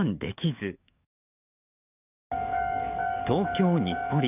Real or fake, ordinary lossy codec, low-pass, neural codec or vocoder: fake; none; 3.6 kHz; codec, 44.1 kHz, 7.8 kbps, DAC